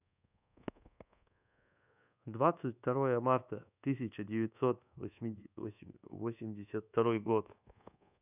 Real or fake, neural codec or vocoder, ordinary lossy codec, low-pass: fake; codec, 24 kHz, 1.2 kbps, DualCodec; none; 3.6 kHz